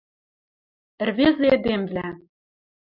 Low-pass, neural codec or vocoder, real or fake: 5.4 kHz; none; real